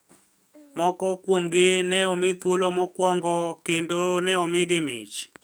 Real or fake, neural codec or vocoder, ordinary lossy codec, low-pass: fake; codec, 44.1 kHz, 2.6 kbps, SNAC; none; none